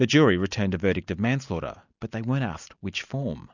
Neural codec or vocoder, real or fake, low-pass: none; real; 7.2 kHz